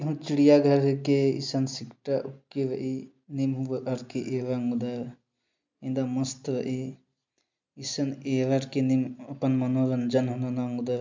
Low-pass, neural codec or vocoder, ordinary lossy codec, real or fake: 7.2 kHz; none; MP3, 64 kbps; real